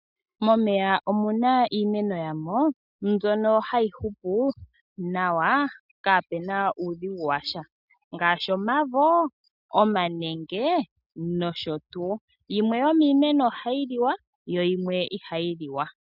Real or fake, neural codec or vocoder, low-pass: real; none; 5.4 kHz